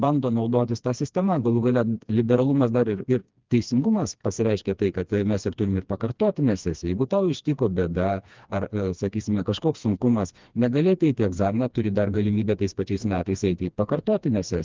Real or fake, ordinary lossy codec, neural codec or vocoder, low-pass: fake; Opus, 32 kbps; codec, 16 kHz, 2 kbps, FreqCodec, smaller model; 7.2 kHz